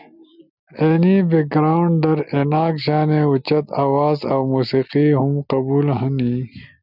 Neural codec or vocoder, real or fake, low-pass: none; real; 5.4 kHz